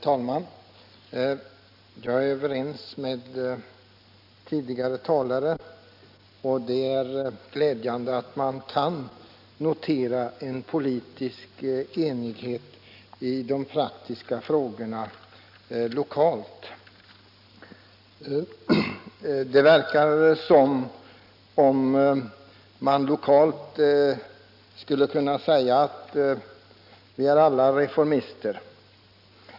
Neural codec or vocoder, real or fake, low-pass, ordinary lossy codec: none; real; 5.4 kHz; none